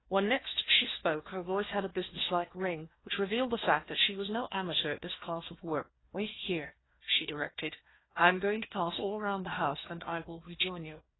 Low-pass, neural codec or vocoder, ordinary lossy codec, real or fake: 7.2 kHz; codec, 16 kHz, 1 kbps, FunCodec, trained on Chinese and English, 50 frames a second; AAC, 16 kbps; fake